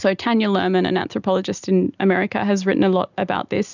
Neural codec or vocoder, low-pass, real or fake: none; 7.2 kHz; real